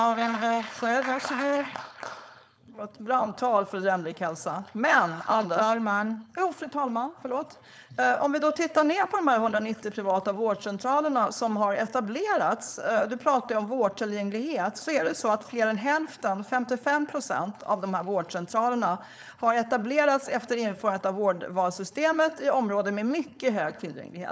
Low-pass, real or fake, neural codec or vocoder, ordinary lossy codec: none; fake; codec, 16 kHz, 4.8 kbps, FACodec; none